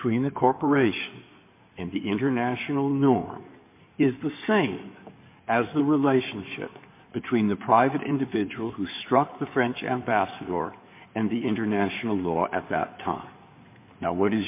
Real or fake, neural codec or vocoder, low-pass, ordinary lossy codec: fake; codec, 16 kHz in and 24 kHz out, 2.2 kbps, FireRedTTS-2 codec; 3.6 kHz; MP3, 24 kbps